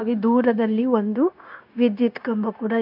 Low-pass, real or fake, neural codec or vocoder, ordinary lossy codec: 5.4 kHz; fake; codec, 16 kHz, 0.9 kbps, LongCat-Audio-Codec; AAC, 48 kbps